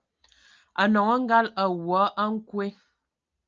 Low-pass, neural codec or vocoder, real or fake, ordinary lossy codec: 7.2 kHz; none; real; Opus, 24 kbps